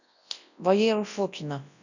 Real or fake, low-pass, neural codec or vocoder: fake; 7.2 kHz; codec, 24 kHz, 0.9 kbps, WavTokenizer, large speech release